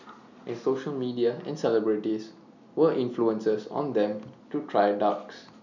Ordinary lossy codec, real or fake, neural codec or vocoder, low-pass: none; real; none; 7.2 kHz